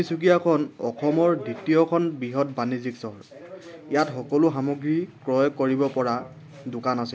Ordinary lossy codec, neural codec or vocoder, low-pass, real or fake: none; none; none; real